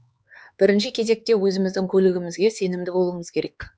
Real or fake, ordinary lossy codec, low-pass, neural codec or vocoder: fake; none; none; codec, 16 kHz, 4 kbps, X-Codec, HuBERT features, trained on LibriSpeech